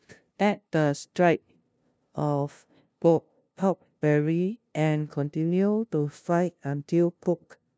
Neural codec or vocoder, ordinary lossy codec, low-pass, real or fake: codec, 16 kHz, 0.5 kbps, FunCodec, trained on LibriTTS, 25 frames a second; none; none; fake